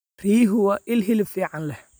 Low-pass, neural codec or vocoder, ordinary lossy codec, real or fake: none; none; none; real